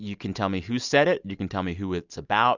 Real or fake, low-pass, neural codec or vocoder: real; 7.2 kHz; none